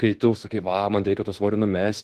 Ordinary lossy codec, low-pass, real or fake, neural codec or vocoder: Opus, 16 kbps; 14.4 kHz; fake; autoencoder, 48 kHz, 32 numbers a frame, DAC-VAE, trained on Japanese speech